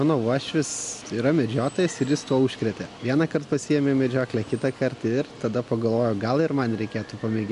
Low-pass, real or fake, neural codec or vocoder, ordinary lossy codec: 10.8 kHz; real; none; MP3, 64 kbps